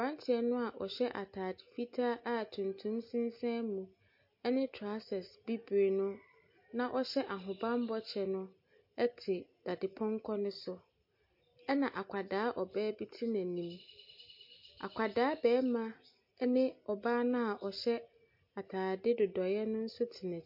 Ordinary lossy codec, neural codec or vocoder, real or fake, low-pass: MP3, 32 kbps; none; real; 5.4 kHz